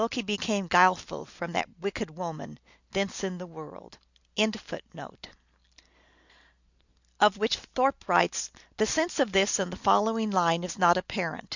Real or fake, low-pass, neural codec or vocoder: real; 7.2 kHz; none